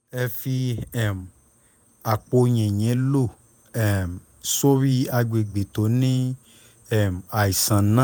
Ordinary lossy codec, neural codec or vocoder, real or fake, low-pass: none; vocoder, 48 kHz, 128 mel bands, Vocos; fake; none